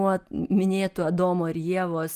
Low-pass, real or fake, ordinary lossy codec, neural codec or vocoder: 14.4 kHz; real; Opus, 32 kbps; none